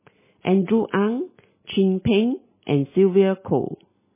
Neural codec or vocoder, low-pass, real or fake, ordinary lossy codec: none; 3.6 kHz; real; MP3, 16 kbps